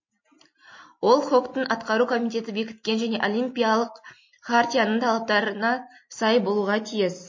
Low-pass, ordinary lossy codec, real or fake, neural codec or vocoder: 7.2 kHz; MP3, 32 kbps; real; none